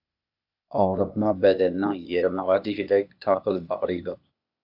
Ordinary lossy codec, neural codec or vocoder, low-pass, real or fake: AAC, 48 kbps; codec, 16 kHz, 0.8 kbps, ZipCodec; 5.4 kHz; fake